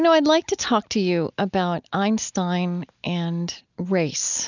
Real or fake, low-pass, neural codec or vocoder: real; 7.2 kHz; none